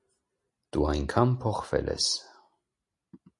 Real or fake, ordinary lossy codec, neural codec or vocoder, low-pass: real; MP3, 48 kbps; none; 10.8 kHz